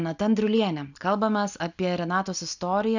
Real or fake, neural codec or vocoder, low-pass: real; none; 7.2 kHz